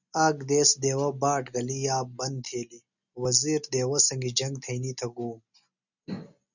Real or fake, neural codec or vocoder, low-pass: real; none; 7.2 kHz